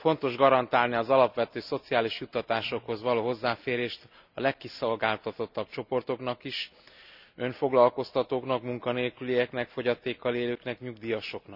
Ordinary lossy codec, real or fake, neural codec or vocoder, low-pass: none; real; none; 5.4 kHz